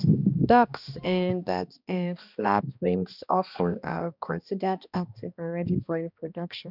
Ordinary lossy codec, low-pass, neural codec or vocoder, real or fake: none; 5.4 kHz; codec, 16 kHz, 1 kbps, X-Codec, HuBERT features, trained on balanced general audio; fake